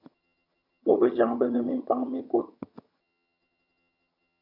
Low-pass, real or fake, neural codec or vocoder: 5.4 kHz; fake; vocoder, 22.05 kHz, 80 mel bands, HiFi-GAN